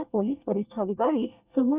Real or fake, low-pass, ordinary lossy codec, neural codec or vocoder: fake; 3.6 kHz; Opus, 64 kbps; codec, 24 kHz, 1 kbps, SNAC